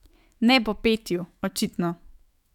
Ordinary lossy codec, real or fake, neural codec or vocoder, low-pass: none; fake; autoencoder, 48 kHz, 128 numbers a frame, DAC-VAE, trained on Japanese speech; 19.8 kHz